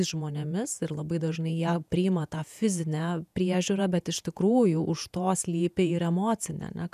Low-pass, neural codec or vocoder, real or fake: 14.4 kHz; vocoder, 48 kHz, 128 mel bands, Vocos; fake